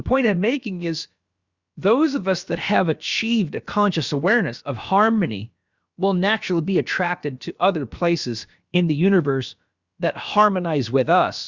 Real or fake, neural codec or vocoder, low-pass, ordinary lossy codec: fake; codec, 16 kHz, about 1 kbps, DyCAST, with the encoder's durations; 7.2 kHz; Opus, 64 kbps